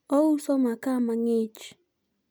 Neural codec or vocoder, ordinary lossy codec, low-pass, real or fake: none; none; none; real